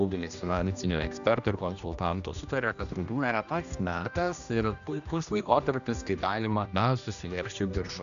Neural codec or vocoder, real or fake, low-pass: codec, 16 kHz, 1 kbps, X-Codec, HuBERT features, trained on general audio; fake; 7.2 kHz